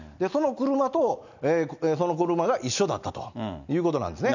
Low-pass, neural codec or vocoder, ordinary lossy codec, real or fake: 7.2 kHz; none; none; real